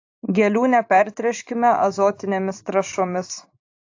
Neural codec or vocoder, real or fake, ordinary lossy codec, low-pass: vocoder, 44.1 kHz, 128 mel bands every 512 samples, BigVGAN v2; fake; AAC, 48 kbps; 7.2 kHz